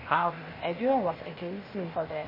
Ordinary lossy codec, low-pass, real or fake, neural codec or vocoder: MP3, 24 kbps; 5.4 kHz; fake; codec, 16 kHz, 0.8 kbps, ZipCodec